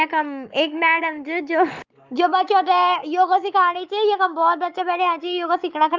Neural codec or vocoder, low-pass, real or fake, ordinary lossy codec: codec, 44.1 kHz, 7.8 kbps, Pupu-Codec; 7.2 kHz; fake; Opus, 24 kbps